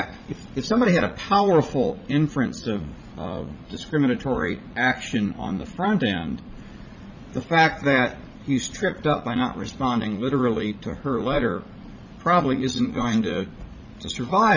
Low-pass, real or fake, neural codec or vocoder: 7.2 kHz; fake; vocoder, 44.1 kHz, 80 mel bands, Vocos